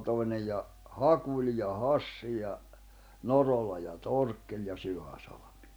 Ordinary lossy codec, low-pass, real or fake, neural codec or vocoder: none; none; real; none